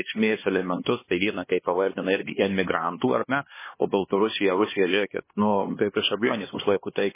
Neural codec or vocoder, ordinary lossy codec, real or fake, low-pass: codec, 16 kHz, 2 kbps, X-Codec, HuBERT features, trained on LibriSpeech; MP3, 16 kbps; fake; 3.6 kHz